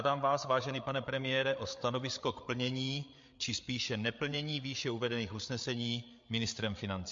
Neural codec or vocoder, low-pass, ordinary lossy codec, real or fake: codec, 16 kHz, 8 kbps, FreqCodec, larger model; 7.2 kHz; MP3, 48 kbps; fake